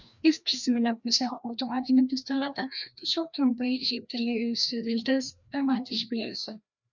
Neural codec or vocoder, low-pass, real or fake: codec, 16 kHz, 1 kbps, FreqCodec, larger model; 7.2 kHz; fake